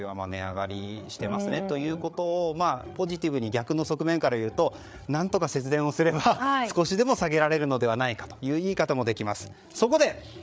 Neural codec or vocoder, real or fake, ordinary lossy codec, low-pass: codec, 16 kHz, 8 kbps, FreqCodec, larger model; fake; none; none